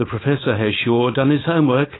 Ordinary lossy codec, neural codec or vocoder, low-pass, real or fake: AAC, 16 kbps; codec, 16 kHz, 4.8 kbps, FACodec; 7.2 kHz; fake